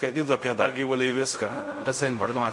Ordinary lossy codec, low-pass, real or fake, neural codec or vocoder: MP3, 48 kbps; 10.8 kHz; fake; codec, 16 kHz in and 24 kHz out, 0.4 kbps, LongCat-Audio-Codec, fine tuned four codebook decoder